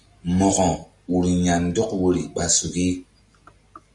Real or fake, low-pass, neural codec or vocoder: real; 10.8 kHz; none